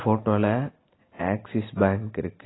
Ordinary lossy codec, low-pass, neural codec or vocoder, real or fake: AAC, 16 kbps; 7.2 kHz; none; real